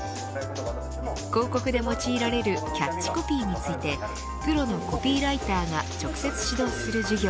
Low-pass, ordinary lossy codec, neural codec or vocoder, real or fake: none; none; none; real